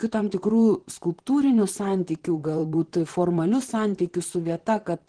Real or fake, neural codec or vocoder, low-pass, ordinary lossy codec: fake; vocoder, 44.1 kHz, 128 mel bands, Pupu-Vocoder; 9.9 kHz; Opus, 16 kbps